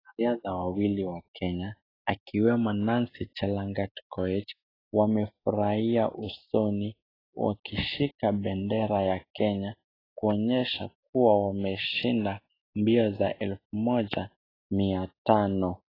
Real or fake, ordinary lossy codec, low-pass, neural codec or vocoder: real; AAC, 24 kbps; 5.4 kHz; none